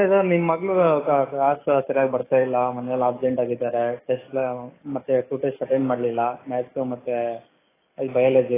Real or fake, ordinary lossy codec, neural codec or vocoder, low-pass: fake; AAC, 16 kbps; codec, 44.1 kHz, 7.8 kbps, DAC; 3.6 kHz